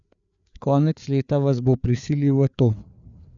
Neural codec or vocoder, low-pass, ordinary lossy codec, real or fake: codec, 16 kHz, 4 kbps, FreqCodec, larger model; 7.2 kHz; none; fake